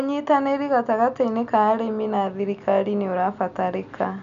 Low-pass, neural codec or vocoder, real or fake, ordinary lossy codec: 7.2 kHz; none; real; none